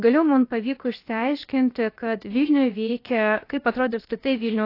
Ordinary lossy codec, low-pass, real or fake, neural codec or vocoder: AAC, 32 kbps; 5.4 kHz; fake; codec, 16 kHz, about 1 kbps, DyCAST, with the encoder's durations